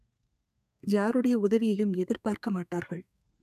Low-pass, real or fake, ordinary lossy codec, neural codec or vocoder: 14.4 kHz; fake; none; codec, 32 kHz, 1.9 kbps, SNAC